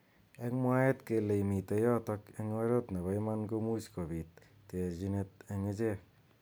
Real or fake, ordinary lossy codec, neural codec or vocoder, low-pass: real; none; none; none